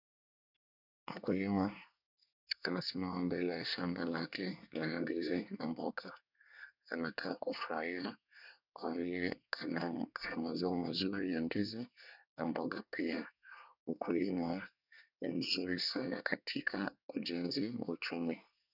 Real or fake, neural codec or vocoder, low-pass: fake; codec, 24 kHz, 1 kbps, SNAC; 5.4 kHz